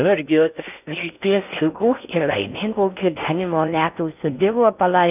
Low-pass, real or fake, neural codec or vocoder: 3.6 kHz; fake; codec, 16 kHz in and 24 kHz out, 0.6 kbps, FocalCodec, streaming, 4096 codes